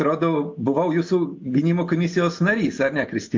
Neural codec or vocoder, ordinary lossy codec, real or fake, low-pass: none; MP3, 48 kbps; real; 7.2 kHz